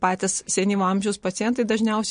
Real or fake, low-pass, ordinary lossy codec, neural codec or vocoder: real; 9.9 kHz; MP3, 48 kbps; none